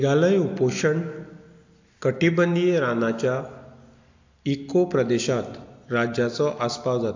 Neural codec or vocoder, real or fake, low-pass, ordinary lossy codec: none; real; 7.2 kHz; AAC, 48 kbps